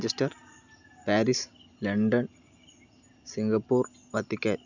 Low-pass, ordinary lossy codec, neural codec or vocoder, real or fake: 7.2 kHz; none; none; real